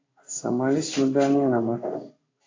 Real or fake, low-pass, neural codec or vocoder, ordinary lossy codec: fake; 7.2 kHz; codec, 16 kHz in and 24 kHz out, 1 kbps, XY-Tokenizer; AAC, 32 kbps